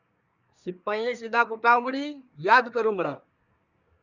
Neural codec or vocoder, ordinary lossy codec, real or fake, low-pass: codec, 24 kHz, 1 kbps, SNAC; Opus, 64 kbps; fake; 7.2 kHz